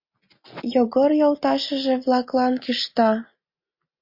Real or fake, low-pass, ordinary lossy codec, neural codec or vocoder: real; 5.4 kHz; MP3, 32 kbps; none